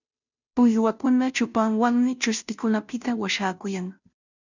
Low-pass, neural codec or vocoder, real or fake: 7.2 kHz; codec, 16 kHz, 0.5 kbps, FunCodec, trained on Chinese and English, 25 frames a second; fake